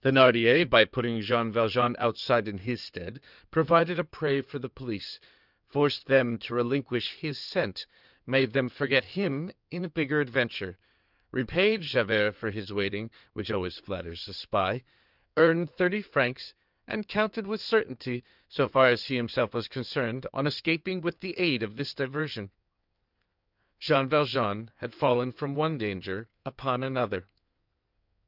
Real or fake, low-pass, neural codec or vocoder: fake; 5.4 kHz; codec, 16 kHz in and 24 kHz out, 2.2 kbps, FireRedTTS-2 codec